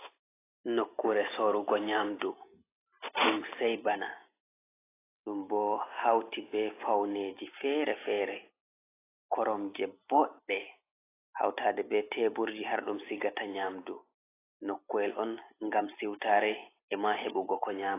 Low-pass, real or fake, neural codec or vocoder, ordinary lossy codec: 3.6 kHz; real; none; AAC, 16 kbps